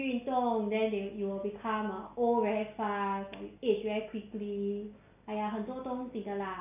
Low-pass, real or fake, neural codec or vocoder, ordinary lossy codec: 3.6 kHz; real; none; none